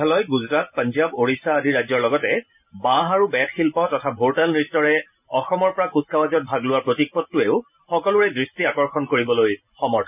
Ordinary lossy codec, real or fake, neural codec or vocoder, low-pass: none; real; none; 3.6 kHz